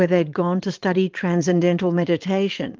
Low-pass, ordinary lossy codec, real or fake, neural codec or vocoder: 7.2 kHz; Opus, 32 kbps; fake; vocoder, 22.05 kHz, 80 mel bands, Vocos